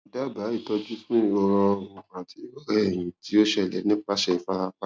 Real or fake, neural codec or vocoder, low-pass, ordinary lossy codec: real; none; none; none